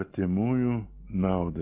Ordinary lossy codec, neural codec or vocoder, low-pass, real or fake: Opus, 32 kbps; none; 3.6 kHz; real